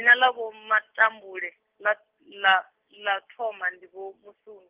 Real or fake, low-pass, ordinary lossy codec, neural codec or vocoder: real; 3.6 kHz; Opus, 16 kbps; none